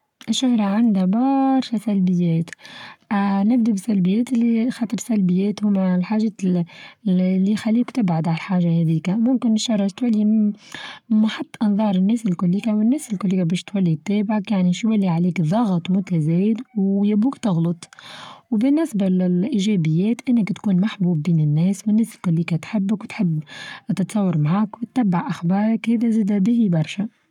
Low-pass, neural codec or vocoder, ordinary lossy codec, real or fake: 19.8 kHz; codec, 44.1 kHz, 7.8 kbps, Pupu-Codec; none; fake